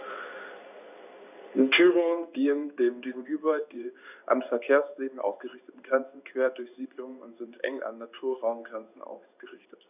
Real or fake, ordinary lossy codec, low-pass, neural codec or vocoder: fake; none; 3.6 kHz; codec, 16 kHz in and 24 kHz out, 1 kbps, XY-Tokenizer